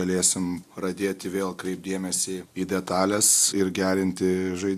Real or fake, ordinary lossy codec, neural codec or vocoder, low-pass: real; AAC, 96 kbps; none; 14.4 kHz